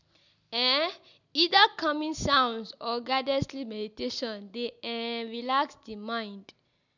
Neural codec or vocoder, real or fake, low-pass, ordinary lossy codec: none; real; 7.2 kHz; none